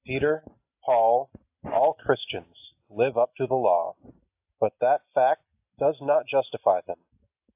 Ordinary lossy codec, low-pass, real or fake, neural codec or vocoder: AAC, 32 kbps; 3.6 kHz; real; none